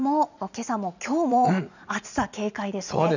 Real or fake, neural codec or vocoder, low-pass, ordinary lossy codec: fake; vocoder, 44.1 kHz, 80 mel bands, Vocos; 7.2 kHz; none